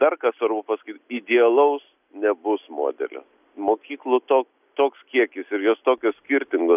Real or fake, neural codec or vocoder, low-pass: real; none; 3.6 kHz